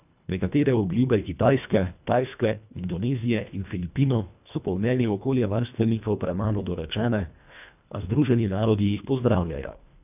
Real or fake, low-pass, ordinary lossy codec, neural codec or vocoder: fake; 3.6 kHz; none; codec, 24 kHz, 1.5 kbps, HILCodec